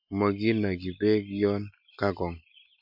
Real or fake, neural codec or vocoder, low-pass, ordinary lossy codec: real; none; 5.4 kHz; MP3, 48 kbps